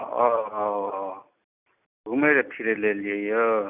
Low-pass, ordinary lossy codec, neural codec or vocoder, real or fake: 3.6 kHz; none; none; real